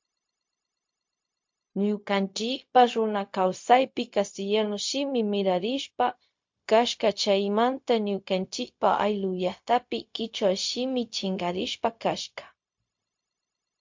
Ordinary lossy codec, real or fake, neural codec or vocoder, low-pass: MP3, 48 kbps; fake; codec, 16 kHz, 0.4 kbps, LongCat-Audio-Codec; 7.2 kHz